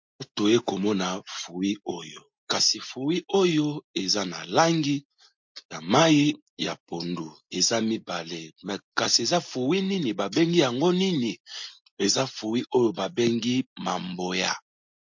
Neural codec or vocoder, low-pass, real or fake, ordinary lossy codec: none; 7.2 kHz; real; MP3, 48 kbps